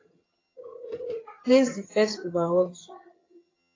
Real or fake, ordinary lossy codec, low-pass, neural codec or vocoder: fake; AAC, 32 kbps; 7.2 kHz; vocoder, 22.05 kHz, 80 mel bands, HiFi-GAN